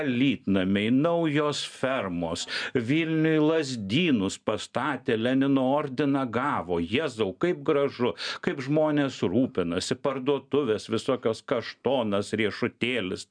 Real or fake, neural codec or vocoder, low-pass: real; none; 9.9 kHz